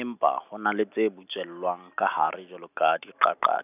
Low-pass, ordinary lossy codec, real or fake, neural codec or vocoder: 3.6 kHz; none; real; none